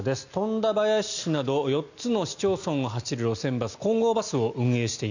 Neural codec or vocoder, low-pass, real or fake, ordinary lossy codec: none; 7.2 kHz; real; none